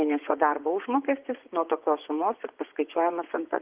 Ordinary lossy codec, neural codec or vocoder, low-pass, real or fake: Opus, 32 kbps; vocoder, 24 kHz, 100 mel bands, Vocos; 3.6 kHz; fake